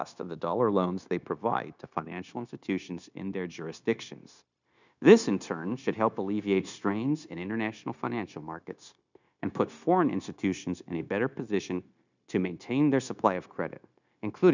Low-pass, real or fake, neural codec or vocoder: 7.2 kHz; fake; codec, 16 kHz, 0.9 kbps, LongCat-Audio-Codec